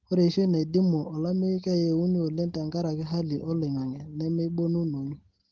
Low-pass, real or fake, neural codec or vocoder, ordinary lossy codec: 7.2 kHz; real; none; Opus, 16 kbps